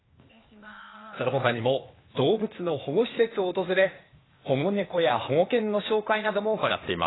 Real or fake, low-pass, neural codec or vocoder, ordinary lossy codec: fake; 7.2 kHz; codec, 16 kHz, 0.8 kbps, ZipCodec; AAC, 16 kbps